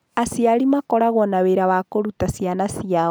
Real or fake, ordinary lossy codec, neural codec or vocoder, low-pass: real; none; none; none